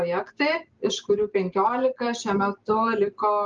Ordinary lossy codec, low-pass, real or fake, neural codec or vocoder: Opus, 32 kbps; 7.2 kHz; real; none